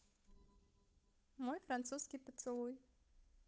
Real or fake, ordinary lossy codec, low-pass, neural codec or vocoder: fake; none; none; codec, 16 kHz, 8 kbps, FunCodec, trained on Chinese and English, 25 frames a second